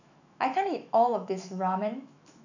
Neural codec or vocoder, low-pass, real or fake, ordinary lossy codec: autoencoder, 48 kHz, 128 numbers a frame, DAC-VAE, trained on Japanese speech; 7.2 kHz; fake; none